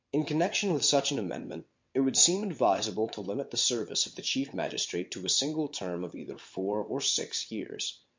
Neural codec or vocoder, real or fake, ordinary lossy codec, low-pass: vocoder, 22.05 kHz, 80 mel bands, WaveNeXt; fake; MP3, 48 kbps; 7.2 kHz